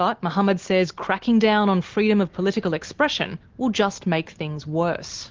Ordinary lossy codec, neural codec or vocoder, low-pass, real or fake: Opus, 16 kbps; none; 7.2 kHz; real